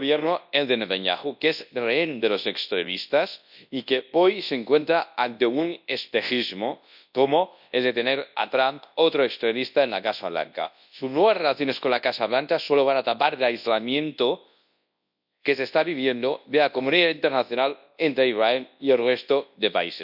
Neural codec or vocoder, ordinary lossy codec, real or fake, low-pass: codec, 24 kHz, 0.9 kbps, WavTokenizer, large speech release; none; fake; 5.4 kHz